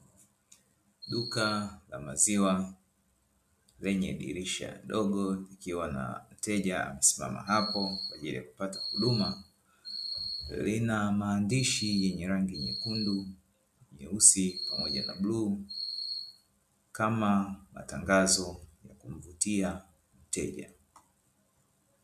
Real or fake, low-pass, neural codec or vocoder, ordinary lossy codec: real; 14.4 kHz; none; MP3, 96 kbps